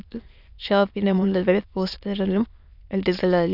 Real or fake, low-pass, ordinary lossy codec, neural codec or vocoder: fake; 5.4 kHz; none; autoencoder, 22.05 kHz, a latent of 192 numbers a frame, VITS, trained on many speakers